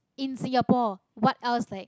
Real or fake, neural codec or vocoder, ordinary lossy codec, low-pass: real; none; none; none